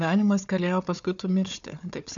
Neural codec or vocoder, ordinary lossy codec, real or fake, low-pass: codec, 16 kHz, 4 kbps, FunCodec, trained on Chinese and English, 50 frames a second; MP3, 96 kbps; fake; 7.2 kHz